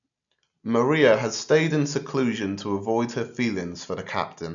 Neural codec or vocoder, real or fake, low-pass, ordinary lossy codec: none; real; 7.2 kHz; none